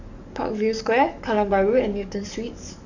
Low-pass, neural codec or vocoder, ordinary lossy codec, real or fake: 7.2 kHz; codec, 44.1 kHz, 7.8 kbps, DAC; none; fake